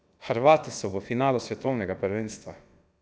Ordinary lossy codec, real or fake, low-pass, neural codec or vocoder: none; fake; none; codec, 16 kHz, about 1 kbps, DyCAST, with the encoder's durations